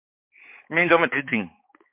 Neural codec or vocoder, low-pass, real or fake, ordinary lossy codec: codec, 16 kHz, 4 kbps, X-Codec, HuBERT features, trained on balanced general audio; 3.6 kHz; fake; MP3, 32 kbps